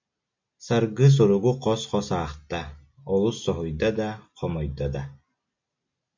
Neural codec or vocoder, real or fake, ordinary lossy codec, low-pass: none; real; MP3, 64 kbps; 7.2 kHz